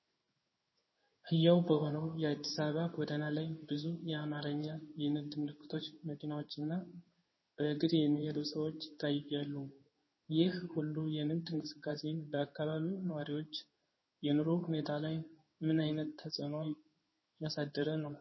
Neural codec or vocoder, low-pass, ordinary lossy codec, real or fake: codec, 16 kHz in and 24 kHz out, 1 kbps, XY-Tokenizer; 7.2 kHz; MP3, 24 kbps; fake